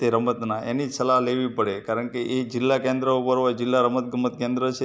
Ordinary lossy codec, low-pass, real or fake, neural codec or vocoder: none; none; real; none